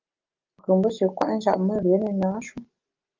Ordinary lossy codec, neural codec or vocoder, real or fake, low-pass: Opus, 24 kbps; none; real; 7.2 kHz